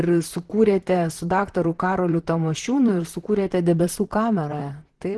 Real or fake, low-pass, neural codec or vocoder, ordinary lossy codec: fake; 10.8 kHz; vocoder, 44.1 kHz, 128 mel bands, Pupu-Vocoder; Opus, 16 kbps